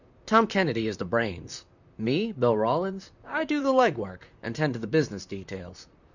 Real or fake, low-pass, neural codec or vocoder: fake; 7.2 kHz; vocoder, 44.1 kHz, 128 mel bands, Pupu-Vocoder